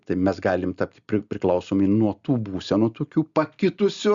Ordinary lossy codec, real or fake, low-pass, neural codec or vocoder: Opus, 64 kbps; real; 7.2 kHz; none